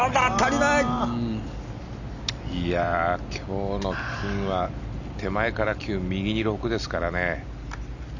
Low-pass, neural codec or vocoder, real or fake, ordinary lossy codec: 7.2 kHz; none; real; none